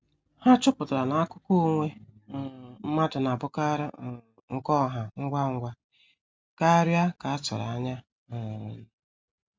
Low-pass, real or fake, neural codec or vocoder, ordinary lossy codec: none; real; none; none